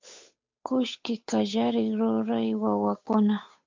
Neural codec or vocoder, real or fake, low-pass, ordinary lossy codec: codec, 16 kHz, 8 kbps, FunCodec, trained on Chinese and English, 25 frames a second; fake; 7.2 kHz; MP3, 64 kbps